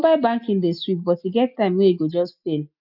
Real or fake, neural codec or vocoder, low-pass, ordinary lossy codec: real; none; 5.4 kHz; none